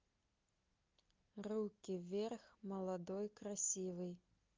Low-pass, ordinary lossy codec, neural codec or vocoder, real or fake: 7.2 kHz; Opus, 32 kbps; none; real